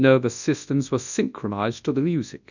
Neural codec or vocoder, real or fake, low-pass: codec, 24 kHz, 0.9 kbps, WavTokenizer, large speech release; fake; 7.2 kHz